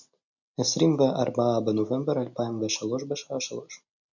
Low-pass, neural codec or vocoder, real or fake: 7.2 kHz; none; real